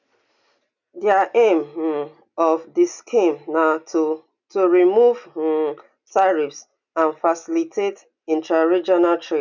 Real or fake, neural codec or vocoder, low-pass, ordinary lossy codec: real; none; 7.2 kHz; none